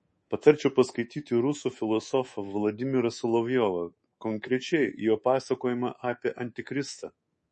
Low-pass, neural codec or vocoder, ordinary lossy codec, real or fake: 10.8 kHz; codec, 24 kHz, 3.1 kbps, DualCodec; MP3, 32 kbps; fake